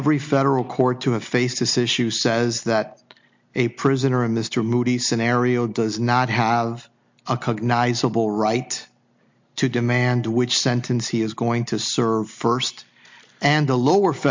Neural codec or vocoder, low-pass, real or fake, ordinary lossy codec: none; 7.2 kHz; real; MP3, 48 kbps